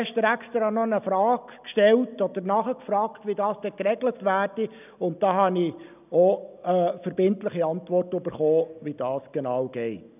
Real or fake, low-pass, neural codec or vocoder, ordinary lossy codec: real; 3.6 kHz; none; none